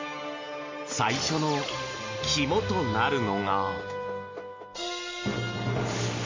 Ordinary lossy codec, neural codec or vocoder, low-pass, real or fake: MP3, 64 kbps; none; 7.2 kHz; real